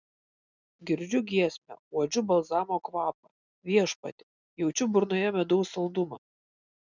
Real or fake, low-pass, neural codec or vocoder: real; 7.2 kHz; none